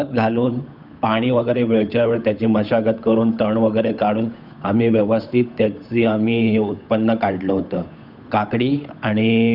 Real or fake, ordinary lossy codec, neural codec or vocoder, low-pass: fake; none; codec, 16 kHz, 8 kbps, FunCodec, trained on Chinese and English, 25 frames a second; 5.4 kHz